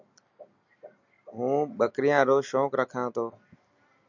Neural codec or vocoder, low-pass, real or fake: none; 7.2 kHz; real